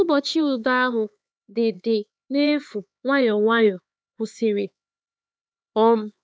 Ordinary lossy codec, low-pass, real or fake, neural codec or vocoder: none; none; fake; codec, 16 kHz, 4 kbps, X-Codec, HuBERT features, trained on LibriSpeech